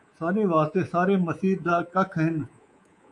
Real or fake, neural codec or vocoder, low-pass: fake; codec, 24 kHz, 3.1 kbps, DualCodec; 10.8 kHz